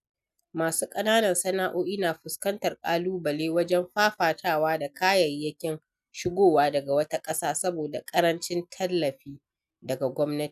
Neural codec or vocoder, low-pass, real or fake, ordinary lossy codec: none; 14.4 kHz; real; none